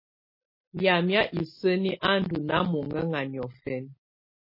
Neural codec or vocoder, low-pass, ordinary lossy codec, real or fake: none; 5.4 kHz; MP3, 24 kbps; real